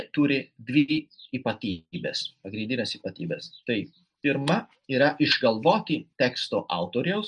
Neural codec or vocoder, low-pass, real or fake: none; 9.9 kHz; real